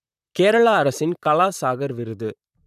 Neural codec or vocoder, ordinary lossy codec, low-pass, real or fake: vocoder, 44.1 kHz, 128 mel bands, Pupu-Vocoder; none; 14.4 kHz; fake